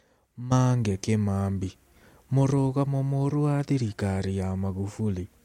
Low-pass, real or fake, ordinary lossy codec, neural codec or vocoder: 19.8 kHz; real; MP3, 64 kbps; none